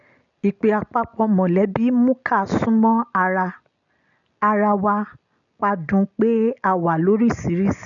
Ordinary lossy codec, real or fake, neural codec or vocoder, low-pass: none; real; none; 7.2 kHz